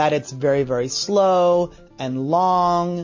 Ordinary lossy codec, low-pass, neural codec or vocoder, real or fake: MP3, 32 kbps; 7.2 kHz; none; real